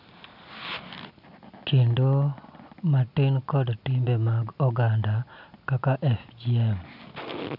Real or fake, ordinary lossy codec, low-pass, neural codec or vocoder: real; none; 5.4 kHz; none